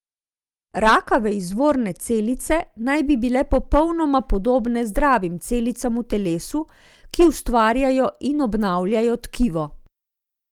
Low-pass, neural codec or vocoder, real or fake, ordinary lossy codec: 19.8 kHz; none; real; Opus, 32 kbps